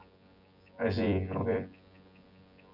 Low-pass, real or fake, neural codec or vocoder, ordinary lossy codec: 5.4 kHz; fake; vocoder, 24 kHz, 100 mel bands, Vocos; none